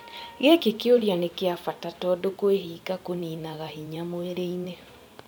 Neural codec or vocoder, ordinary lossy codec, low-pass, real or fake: vocoder, 44.1 kHz, 128 mel bands every 512 samples, BigVGAN v2; none; none; fake